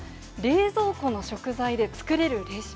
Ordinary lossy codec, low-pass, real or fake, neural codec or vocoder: none; none; real; none